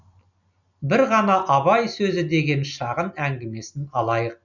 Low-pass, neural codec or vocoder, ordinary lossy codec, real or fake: 7.2 kHz; none; none; real